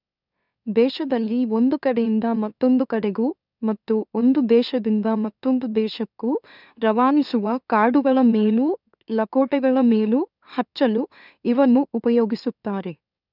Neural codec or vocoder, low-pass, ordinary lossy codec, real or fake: autoencoder, 44.1 kHz, a latent of 192 numbers a frame, MeloTTS; 5.4 kHz; none; fake